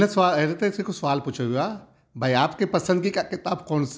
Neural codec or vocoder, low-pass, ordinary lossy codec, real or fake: none; none; none; real